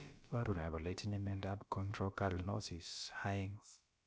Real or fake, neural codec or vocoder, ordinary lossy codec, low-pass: fake; codec, 16 kHz, about 1 kbps, DyCAST, with the encoder's durations; none; none